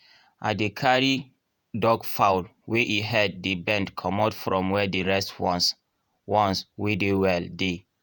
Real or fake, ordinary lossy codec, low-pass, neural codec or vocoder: fake; none; none; vocoder, 48 kHz, 128 mel bands, Vocos